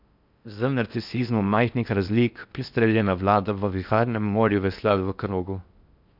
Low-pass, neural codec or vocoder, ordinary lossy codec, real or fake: 5.4 kHz; codec, 16 kHz in and 24 kHz out, 0.6 kbps, FocalCodec, streaming, 4096 codes; none; fake